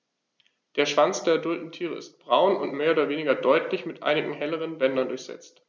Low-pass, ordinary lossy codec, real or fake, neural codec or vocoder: none; none; real; none